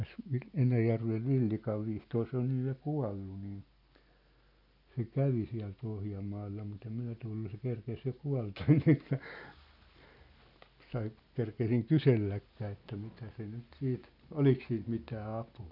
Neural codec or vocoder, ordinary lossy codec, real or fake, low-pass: none; none; real; 5.4 kHz